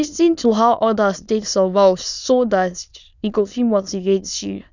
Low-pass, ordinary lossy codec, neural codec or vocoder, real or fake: 7.2 kHz; none; autoencoder, 22.05 kHz, a latent of 192 numbers a frame, VITS, trained on many speakers; fake